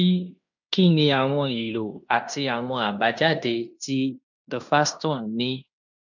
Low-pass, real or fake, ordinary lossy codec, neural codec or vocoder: 7.2 kHz; fake; none; codec, 16 kHz in and 24 kHz out, 0.9 kbps, LongCat-Audio-Codec, fine tuned four codebook decoder